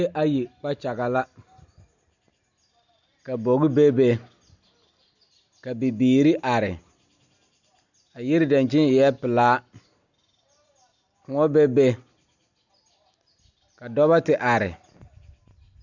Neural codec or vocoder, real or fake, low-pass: none; real; 7.2 kHz